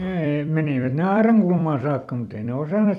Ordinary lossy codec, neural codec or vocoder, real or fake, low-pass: none; vocoder, 44.1 kHz, 128 mel bands every 256 samples, BigVGAN v2; fake; 14.4 kHz